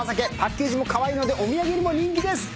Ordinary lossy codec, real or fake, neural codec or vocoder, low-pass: none; real; none; none